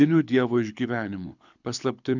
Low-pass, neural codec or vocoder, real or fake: 7.2 kHz; codec, 24 kHz, 6 kbps, HILCodec; fake